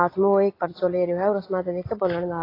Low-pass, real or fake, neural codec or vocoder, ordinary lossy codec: 5.4 kHz; real; none; AAC, 24 kbps